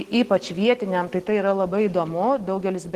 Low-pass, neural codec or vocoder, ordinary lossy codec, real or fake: 14.4 kHz; none; Opus, 16 kbps; real